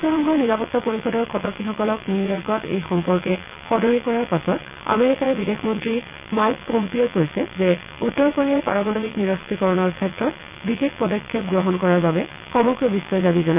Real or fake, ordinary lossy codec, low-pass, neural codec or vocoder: fake; none; 3.6 kHz; vocoder, 22.05 kHz, 80 mel bands, WaveNeXt